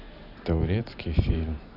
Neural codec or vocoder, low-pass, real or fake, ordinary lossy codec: vocoder, 44.1 kHz, 128 mel bands every 512 samples, BigVGAN v2; 5.4 kHz; fake; none